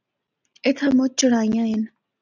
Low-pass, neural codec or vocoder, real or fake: 7.2 kHz; none; real